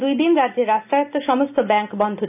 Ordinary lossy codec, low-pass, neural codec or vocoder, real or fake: none; 3.6 kHz; none; real